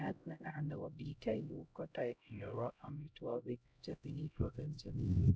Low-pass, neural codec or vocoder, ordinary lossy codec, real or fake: none; codec, 16 kHz, 0.5 kbps, X-Codec, HuBERT features, trained on LibriSpeech; none; fake